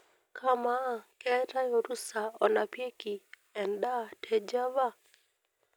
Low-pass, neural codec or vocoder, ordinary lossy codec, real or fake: none; none; none; real